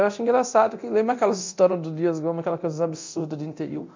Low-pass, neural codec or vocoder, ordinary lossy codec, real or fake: 7.2 kHz; codec, 24 kHz, 0.9 kbps, DualCodec; MP3, 64 kbps; fake